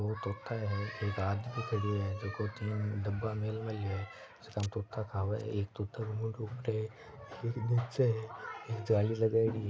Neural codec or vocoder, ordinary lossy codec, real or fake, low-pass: none; none; real; none